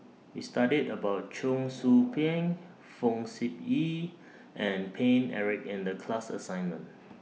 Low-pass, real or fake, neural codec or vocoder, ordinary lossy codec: none; real; none; none